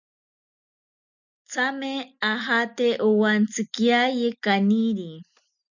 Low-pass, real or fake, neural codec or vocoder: 7.2 kHz; real; none